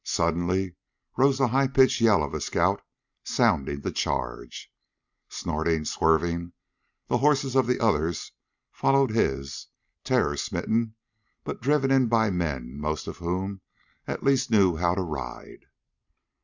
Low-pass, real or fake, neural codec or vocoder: 7.2 kHz; real; none